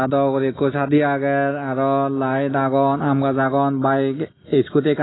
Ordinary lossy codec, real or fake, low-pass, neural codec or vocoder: AAC, 16 kbps; real; 7.2 kHz; none